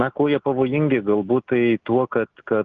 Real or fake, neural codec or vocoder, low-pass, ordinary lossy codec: real; none; 7.2 kHz; Opus, 24 kbps